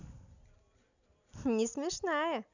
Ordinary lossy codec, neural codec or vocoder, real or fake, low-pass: none; none; real; 7.2 kHz